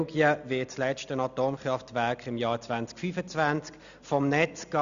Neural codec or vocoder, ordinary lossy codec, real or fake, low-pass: none; none; real; 7.2 kHz